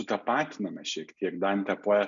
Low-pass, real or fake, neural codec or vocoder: 7.2 kHz; real; none